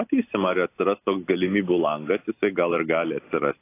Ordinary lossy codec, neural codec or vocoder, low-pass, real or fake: AAC, 24 kbps; none; 3.6 kHz; real